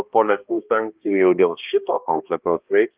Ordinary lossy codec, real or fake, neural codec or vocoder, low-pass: Opus, 24 kbps; fake; codec, 16 kHz, 1 kbps, X-Codec, HuBERT features, trained on balanced general audio; 3.6 kHz